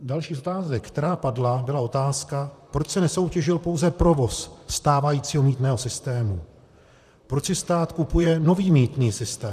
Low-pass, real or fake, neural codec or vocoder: 14.4 kHz; fake; vocoder, 44.1 kHz, 128 mel bands, Pupu-Vocoder